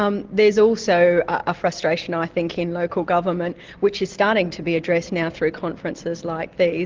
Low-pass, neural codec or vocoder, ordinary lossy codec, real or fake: 7.2 kHz; none; Opus, 16 kbps; real